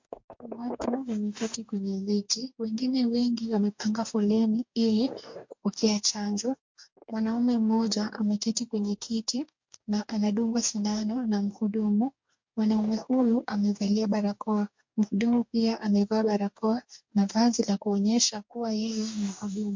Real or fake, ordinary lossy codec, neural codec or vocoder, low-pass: fake; MP3, 48 kbps; codec, 44.1 kHz, 2.6 kbps, DAC; 7.2 kHz